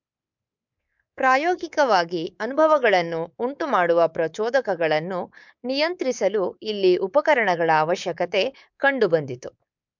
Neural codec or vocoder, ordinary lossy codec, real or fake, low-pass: codec, 24 kHz, 3.1 kbps, DualCodec; MP3, 64 kbps; fake; 7.2 kHz